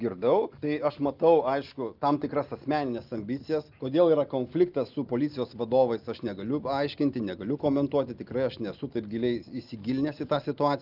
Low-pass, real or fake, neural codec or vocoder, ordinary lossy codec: 5.4 kHz; real; none; Opus, 24 kbps